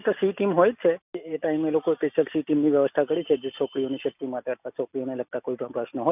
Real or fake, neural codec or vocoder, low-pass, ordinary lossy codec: real; none; 3.6 kHz; none